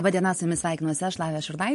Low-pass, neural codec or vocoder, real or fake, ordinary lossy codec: 14.4 kHz; none; real; MP3, 48 kbps